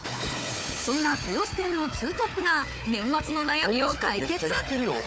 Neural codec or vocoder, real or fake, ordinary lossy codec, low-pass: codec, 16 kHz, 4 kbps, FunCodec, trained on Chinese and English, 50 frames a second; fake; none; none